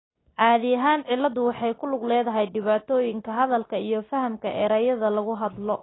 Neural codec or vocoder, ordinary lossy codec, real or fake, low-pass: none; AAC, 16 kbps; real; 7.2 kHz